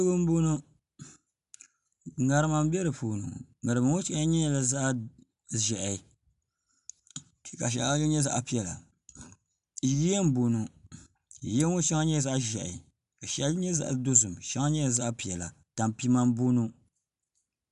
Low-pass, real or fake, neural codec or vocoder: 10.8 kHz; real; none